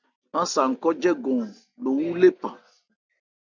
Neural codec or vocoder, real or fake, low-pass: none; real; 7.2 kHz